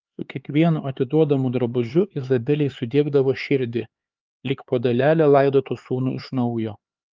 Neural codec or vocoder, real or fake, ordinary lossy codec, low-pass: codec, 16 kHz, 4 kbps, X-Codec, HuBERT features, trained on LibriSpeech; fake; Opus, 32 kbps; 7.2 kHz